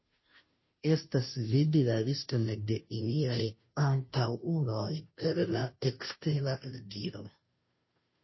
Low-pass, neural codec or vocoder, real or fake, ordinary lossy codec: 7.2 kHz; codec, 16 kHz, 0.5 kbps, FunCodec, trained on Chinese and English, 25 frames a second; fake; MP3, 24 kbps